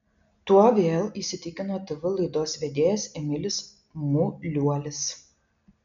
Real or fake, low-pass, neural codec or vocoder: real; 7.2 kHz; none